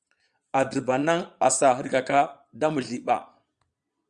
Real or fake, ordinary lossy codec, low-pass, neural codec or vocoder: fake; Opus, 64 kbps; 9.9 kHz; vocoder, 22.05 kHz, 80 mel bands, Vocos